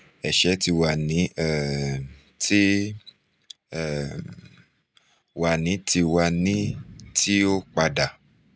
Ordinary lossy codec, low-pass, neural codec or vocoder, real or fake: none; none; none; real